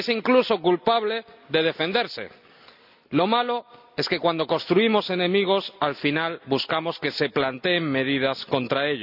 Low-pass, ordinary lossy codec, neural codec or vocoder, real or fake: 5.4 kHz; none; none; real